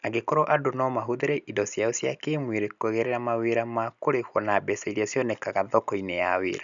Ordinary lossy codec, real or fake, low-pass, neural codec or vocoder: MP3, 96 kbps; real; 7.2 kHz; none